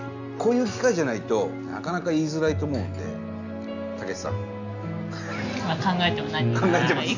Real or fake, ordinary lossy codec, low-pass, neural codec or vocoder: real; none; 7.2 kHz; none